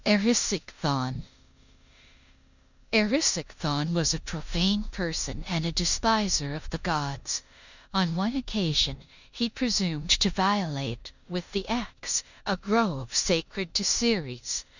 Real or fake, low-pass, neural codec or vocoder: fake; 7.2 kHz; codec, 16 kHz in and 24 kHz out, 0.9 kbps, LongCat-Audio-Codec, four codebook decoder